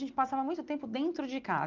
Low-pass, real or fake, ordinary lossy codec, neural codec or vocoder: 7.2 kHz; real; Opus, 32 kbps; none